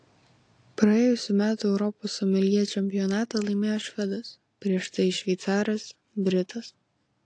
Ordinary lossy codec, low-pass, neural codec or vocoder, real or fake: AAC, 48 kbps; 9.9 kHz; none; real